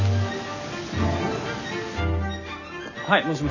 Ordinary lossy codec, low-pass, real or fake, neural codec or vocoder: none; 7.2 kHz; real; none